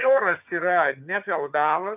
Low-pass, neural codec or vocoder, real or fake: 3.6 kHz; vocoder, 22.05 kHz, 80 mel bands, HiFi-GAN; fake